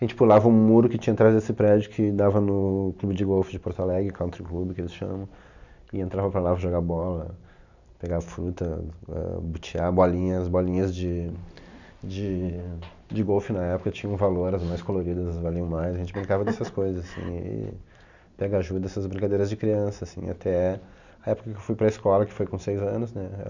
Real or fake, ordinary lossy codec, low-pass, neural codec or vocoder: real; none; 7.2 kHz; none